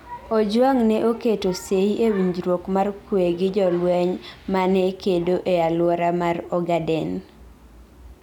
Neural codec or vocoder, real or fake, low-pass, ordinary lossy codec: none; real; 19.8 kHz; none